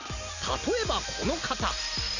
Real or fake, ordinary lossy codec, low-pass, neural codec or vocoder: real; none; 7.2 kHz; none